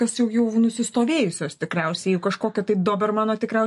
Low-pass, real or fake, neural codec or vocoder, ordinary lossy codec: 14.4 kHz; real; none; MP3, 48 kbps